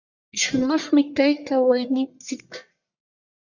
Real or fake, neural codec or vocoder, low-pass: fake; codec, 44.1 kHz, 1.7 kbps, Pupu-Codec; 7.2 kHz